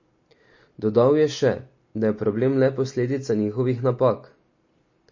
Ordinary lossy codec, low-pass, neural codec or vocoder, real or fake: MP3, 32 kbps; 7.2 kHz; none; real